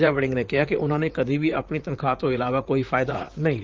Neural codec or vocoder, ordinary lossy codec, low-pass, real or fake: vocoder, 44.1 kHz, 128 mel bands, Pupu-Vocoder; Opus, 32 kbps; 7.2 kHz; fake